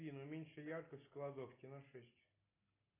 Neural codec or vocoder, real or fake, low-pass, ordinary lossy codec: none; real; 3.6 kHz; AAC, 16 kbps